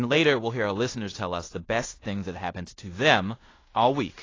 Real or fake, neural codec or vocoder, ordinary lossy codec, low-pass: fake; codec, 16 kHz in and 24 kHz out, 0.9 kbps, LongCat-Audio-Codec, fine tuned four codebook decoder; AAC, 32 kbps; 7.2 kHz